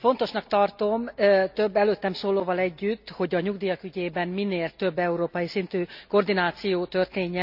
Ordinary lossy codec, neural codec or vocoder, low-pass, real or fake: none; none; 5.4 kHz; real